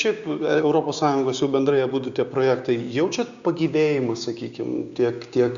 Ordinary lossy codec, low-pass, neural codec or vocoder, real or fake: Opus, 64 kbps; 7.2 kHz; codec, 16 kHz, 6 kbps, DAC; fake